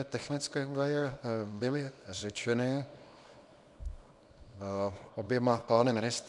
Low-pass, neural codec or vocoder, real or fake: 10.8 kHz; codec, 24 kHz, 0.9 kbps, WavTokenizer, small release; fake